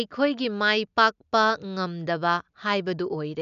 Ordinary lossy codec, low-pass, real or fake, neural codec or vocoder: none; 7.2 kHz; real; none